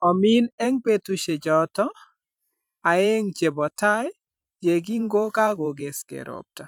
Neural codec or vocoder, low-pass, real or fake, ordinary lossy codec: vocoder, 44.1 kHz, 128 mel bands every 512 samples, BigVGAN v2; 19.8 kHz; fake; none